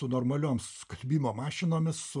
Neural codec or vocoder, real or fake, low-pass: none; real; 10.8 kHz